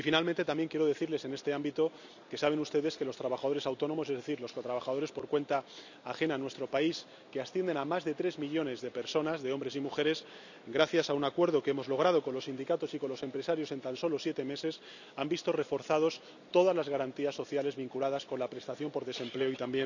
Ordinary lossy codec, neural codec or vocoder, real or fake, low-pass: none; none; real; 7.2 kHz